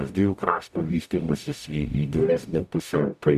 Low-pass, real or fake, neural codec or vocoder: 14.4 kHz; fake; codec, 44.1 kHz, 0.9 kbps, DAC